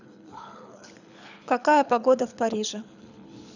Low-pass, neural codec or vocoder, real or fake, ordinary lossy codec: 7.2 kHz; codec, 24 kHz, 6 kbps, HILCodec; fake; none